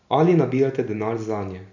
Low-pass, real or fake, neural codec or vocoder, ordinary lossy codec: 7.2 kHz; real; none; none